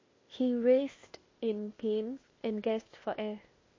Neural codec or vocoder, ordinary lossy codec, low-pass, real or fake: codec, 16 kHz, 0.8 kbps, ZipCodec; MP3, 32 kbps; 7.2 kHz; fake